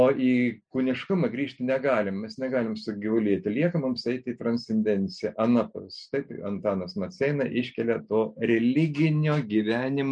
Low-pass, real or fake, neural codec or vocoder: 9.9 kHz; real; none